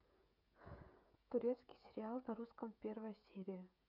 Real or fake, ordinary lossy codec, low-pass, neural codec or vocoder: fake; none; 5.4 kHz; codec, 16 kHz, 16 kbps, FreqCodec, smaller model